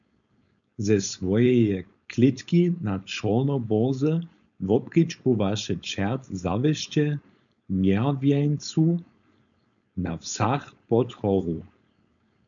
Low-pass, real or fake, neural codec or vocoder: 7.2 kHz; fake; codec, 16 kHz, 4.8 kbps, FACodec